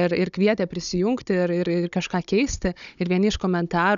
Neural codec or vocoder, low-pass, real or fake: codec, 16 kHz, 16 kbps, FunCodec, trained on Chinese and English, 50 frames a second; 7.2 kHz; fake